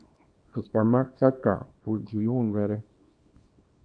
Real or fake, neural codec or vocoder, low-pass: fake; codec, 24 kHz, 0.9 kbps, WavTokenizer, small release; 9.9 kHz